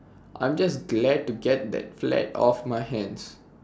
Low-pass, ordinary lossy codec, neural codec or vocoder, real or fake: none; none; none; real